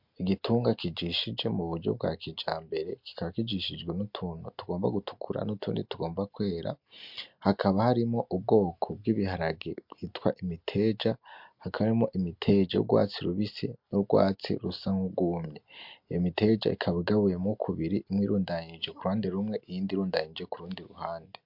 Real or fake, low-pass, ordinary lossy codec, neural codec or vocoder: real; 5.4 kHz; MP3, 48 kbps; none